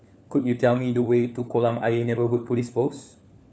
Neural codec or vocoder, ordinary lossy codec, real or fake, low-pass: codec, 16 kHz, 4 kbps, FunCodec, trained on LibriTTS, 50 frames a second; none; fake; none